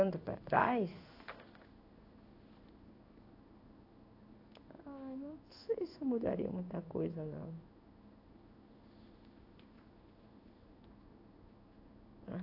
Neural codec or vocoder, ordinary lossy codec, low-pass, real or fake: codec, 16 kHz in and 24 kHz out, 1 kbps, XY-Tokenizer; AAC, 32 kbps; 5.4 kHz; fake